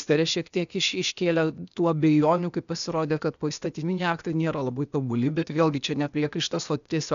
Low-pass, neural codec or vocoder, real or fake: 7.2 kHz; codec, 16 kHz, 0.8 kbps, ZipCodec; fake